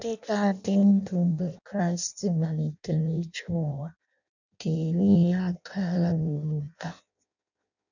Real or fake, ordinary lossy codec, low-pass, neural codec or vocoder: fake; none; 7.2 kHz; codec, 16 kHz in and 24 kHz out, 0.6 kbps, FireRedTTS-2 codec